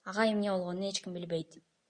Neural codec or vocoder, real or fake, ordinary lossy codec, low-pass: none; real; Opus, 64 kbps; 9.9 kHz